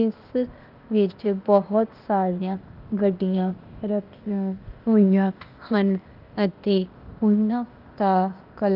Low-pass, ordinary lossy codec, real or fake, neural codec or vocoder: 5.4 kHz; Opus, 24 kbps; fake; codec, 16 kHz, 0.8 kbps, ZipCodec